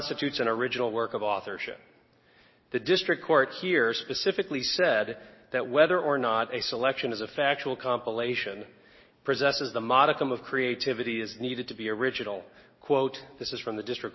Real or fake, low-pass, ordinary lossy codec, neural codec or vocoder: real; 7.2 kHz; MP3, 24 kbps; none